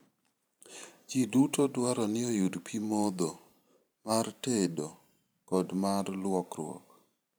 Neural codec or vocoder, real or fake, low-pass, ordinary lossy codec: vocoder, 44.1 kHz, 128 mel bands every 512 samples, BigVGAN v2; fake; none; none